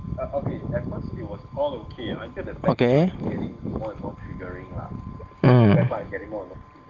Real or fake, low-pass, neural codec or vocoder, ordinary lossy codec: real; 7.2 kHz; none; Opus, 16 kbps